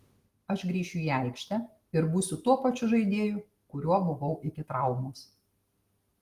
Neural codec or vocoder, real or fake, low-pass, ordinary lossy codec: none; real; 14.4 kHz; Opus, 24 kbps